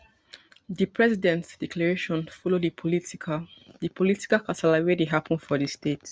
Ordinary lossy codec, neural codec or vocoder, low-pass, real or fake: none; none; none; real